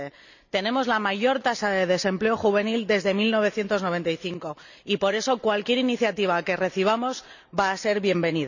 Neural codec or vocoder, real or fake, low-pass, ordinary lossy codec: none; real; 7.2 kHz; none